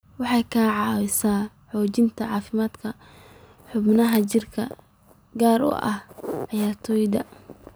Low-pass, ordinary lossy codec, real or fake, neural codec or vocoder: none; none; real; none